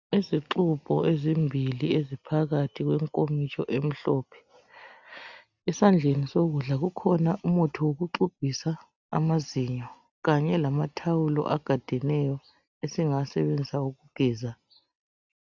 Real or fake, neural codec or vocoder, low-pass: real; none; 7.2 kHz